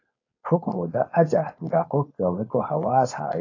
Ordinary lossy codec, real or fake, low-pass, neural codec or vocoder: AAC, 32 kbps; fake; 7.2 kHz; codec, 16 kHz, 4.8 kbps, FACodec